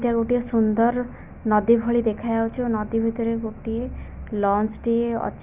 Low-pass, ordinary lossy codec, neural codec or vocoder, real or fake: 3.6 kHz; none; none; real